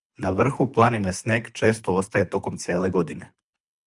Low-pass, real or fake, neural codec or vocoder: 10.8 kHz; fake; codec, 24 kHz, 3 kbps, HILCodec